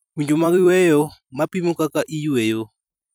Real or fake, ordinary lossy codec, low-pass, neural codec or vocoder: fake; none; none; vocoder, 44.1 kHz, 128 mel bands every 256 samples, BigVGAN v2